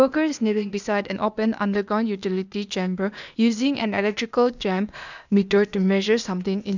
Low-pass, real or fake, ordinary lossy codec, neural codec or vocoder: 7.2 kHz; fake; none; codec, 16 kHz, 0.8 kbps, ZipCodec